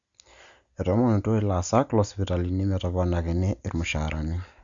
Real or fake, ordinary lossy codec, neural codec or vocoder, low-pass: real; Opus, 64 kbps; none; 7.2 kHz